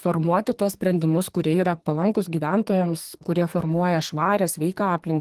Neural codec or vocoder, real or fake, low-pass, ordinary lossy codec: codec, 44.1 kHz, 2.6 kbps, SNAC; fake; 14.4 kHz; Opus, 24 kbps